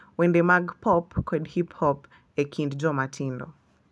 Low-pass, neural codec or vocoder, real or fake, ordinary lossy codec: none; none; real; none